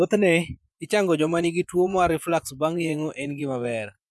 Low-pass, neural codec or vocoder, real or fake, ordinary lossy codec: none; vocoder, 24 kHz, 100 mel bands, Vocos; fake; none